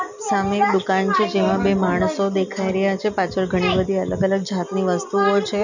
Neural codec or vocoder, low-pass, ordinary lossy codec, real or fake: none; 7.2 kHz; none; real